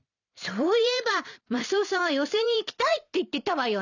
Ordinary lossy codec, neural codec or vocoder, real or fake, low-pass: none; vocoder, 44.1 kHz, 128 mel bands every 256 samples, BigVGAN v2; fake; 7.2 kHz